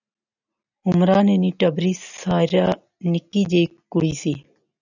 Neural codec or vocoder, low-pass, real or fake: none; 7.2 kHz; real